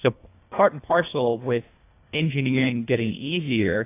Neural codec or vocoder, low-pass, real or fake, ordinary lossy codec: codec, 24 kHz, 1.5 kbps, HILCodec; 3.6 kHz; fake; AAC, 24 kbps